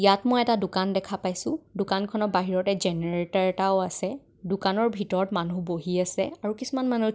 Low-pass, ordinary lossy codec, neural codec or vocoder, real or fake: none; none; none; real